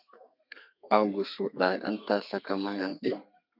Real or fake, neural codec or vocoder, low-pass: fake; codec, 32 kHz, 1.9 kbps, SNAC; 5.4 kHz